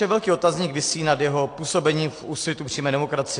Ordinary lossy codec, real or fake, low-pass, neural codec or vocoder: AAC, 48 kbps; real; 9.9 kHz; none